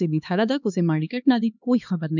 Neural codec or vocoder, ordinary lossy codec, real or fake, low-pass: codec, 16 kHz, 2 kbps, X-Codec, HuBERT features, trained on LibriSpeech; none; fake; 7.2 kHz